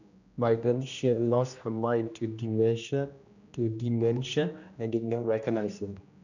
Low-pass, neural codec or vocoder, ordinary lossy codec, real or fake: 7.2 kHz; codec, 16 kHz, 1 kbps, X-Codec, HuBERT features, trained on general audio; none; fake